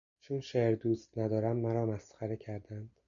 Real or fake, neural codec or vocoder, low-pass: real; none; 7.2 kHz